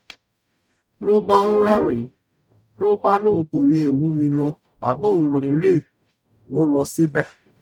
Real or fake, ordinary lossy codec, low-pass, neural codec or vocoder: fake; none; 19.8 kHz; codec, 44.1 kHz, 0.9 kbps, DAC